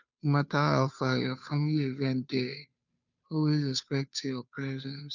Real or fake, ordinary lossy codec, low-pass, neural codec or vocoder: fake; none; 7.2 kHz; codec, 16 kHz, 2 kbps, FunCodec, trained on Chinese and English, 25 frames a second